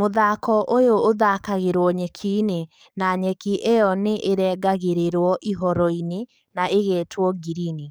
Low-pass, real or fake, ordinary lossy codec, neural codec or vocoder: none; fake; none; codec, 44.1 kHz, 7.8 kbps, DAC